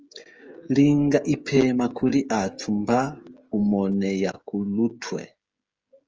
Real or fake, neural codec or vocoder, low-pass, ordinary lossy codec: fake; codec, 16 kHz, 16 kbps, FreqCodec, smaller model; 7.2 kHz; Opus, 24 kbps